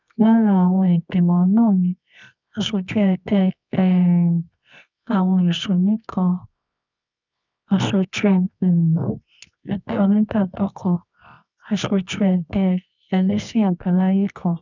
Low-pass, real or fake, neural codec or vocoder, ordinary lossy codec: 7.2 kHz; fake; codec, 24 kHz, 0.9 kbps, WavTokenizer, medium music audio release; none